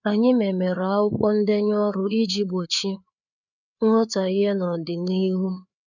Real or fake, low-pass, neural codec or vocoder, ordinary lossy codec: fake; 7.2 kHz; codec, 16 kHz, 4 kbps, FreqCodec, larger model; none